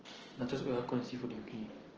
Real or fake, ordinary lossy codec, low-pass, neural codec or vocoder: real; Opus, 16 kbps; 7.2 kHz; none